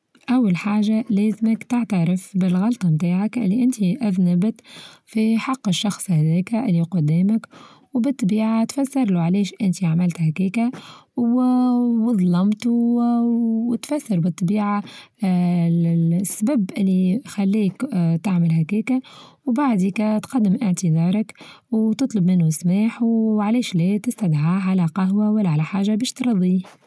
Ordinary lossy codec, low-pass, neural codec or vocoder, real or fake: none; none; none; real